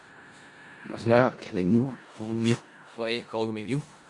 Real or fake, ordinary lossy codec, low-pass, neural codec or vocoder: fake; Opus, 64 kbps; 10.8 kHz; codec, 16 kHz in and 24 kHz out, 0.4 kbps, LongCat-Audio-Codec, four codebook decoder